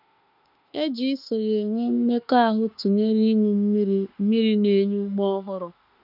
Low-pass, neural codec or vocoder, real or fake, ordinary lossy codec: 5.4 kHz; autoencoder, 48 kHz, 32 numbers a frame, DAC-VAE, trained on Japanese speech; fake; none